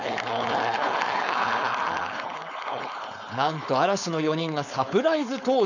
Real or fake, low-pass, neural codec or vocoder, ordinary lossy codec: fake; 7.2 kHz; codec, 16 kHz, 4.8 kbps, FACodec; none